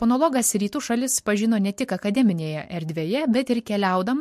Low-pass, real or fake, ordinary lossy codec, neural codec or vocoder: 14.4 kHz; real; MP3, 64 kbps; none